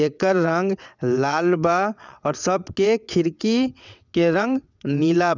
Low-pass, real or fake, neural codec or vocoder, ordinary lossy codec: 7.2 kHz; fake; vocoder, 22.05 kHz, 80 mel bands, WaveNeXt; none